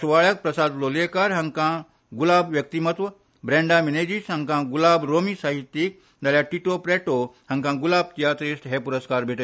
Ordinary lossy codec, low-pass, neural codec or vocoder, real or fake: none; none; none; real